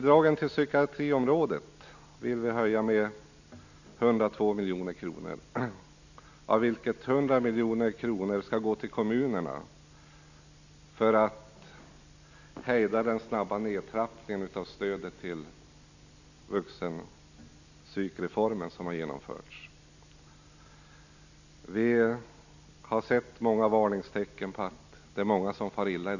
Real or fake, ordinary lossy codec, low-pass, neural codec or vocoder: real; none; 7.2 kHz; none